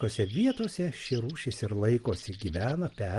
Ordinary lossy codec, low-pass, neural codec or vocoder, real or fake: Opus, 24 kbps; 10.8 kHz; none; real